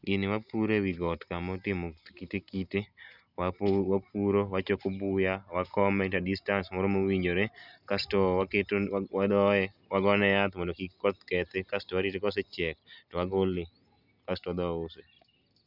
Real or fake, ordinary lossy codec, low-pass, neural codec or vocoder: real; none; 5.4 kHz; none